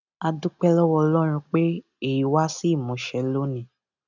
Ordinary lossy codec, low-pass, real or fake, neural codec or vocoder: none; 7.2 kHz; real; none